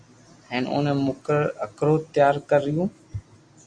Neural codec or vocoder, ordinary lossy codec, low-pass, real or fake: none; Opus, 64 kbps; 9.9 kHz; real